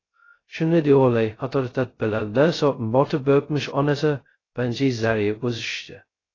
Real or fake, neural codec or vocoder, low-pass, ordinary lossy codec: fake; codec, 16 kHz, 0.2 kbps, FocalCodec; 7.2 kHz; AAC, 32 kbps